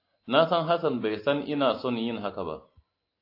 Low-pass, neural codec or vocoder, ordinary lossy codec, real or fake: 5.4 kHz; none; AAC, 32 kbps; real